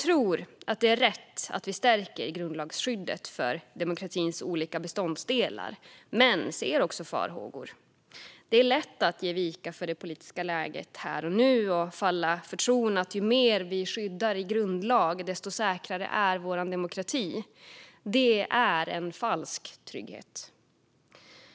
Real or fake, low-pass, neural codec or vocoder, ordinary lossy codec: real; none; none; none